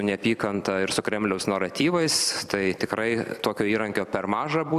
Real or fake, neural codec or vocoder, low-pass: fake; vocoder, 44.1 kHz, 128 mel bands every 256 samples, BigVGAN v2; 14.4 kHz